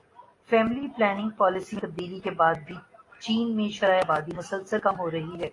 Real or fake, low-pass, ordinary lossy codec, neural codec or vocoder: real; 10.8 kHz; AAC, 32 kbps; none